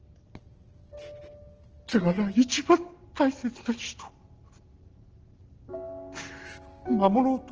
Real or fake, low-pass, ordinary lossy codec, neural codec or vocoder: real; 7.2 kHz; Opus, 16 kbps; none